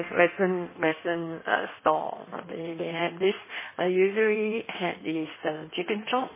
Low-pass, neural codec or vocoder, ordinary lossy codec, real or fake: 3.6 kHz; codec, 16 kHz in and 24 kHz out, 1.1 kbps, FireRedTTS-2 codec; MP3, 16 kbps; fake